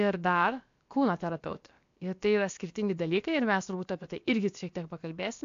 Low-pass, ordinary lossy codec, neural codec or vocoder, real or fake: 7.2 kHz; AAC, 64 kbps; codec, 16 kHz, about 1 kbps, DyCAST, with the encoder's durations; fake